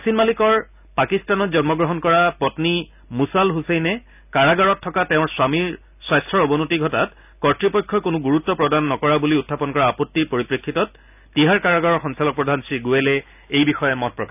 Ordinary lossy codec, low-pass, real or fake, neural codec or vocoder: none; 3.6 kHz; real; none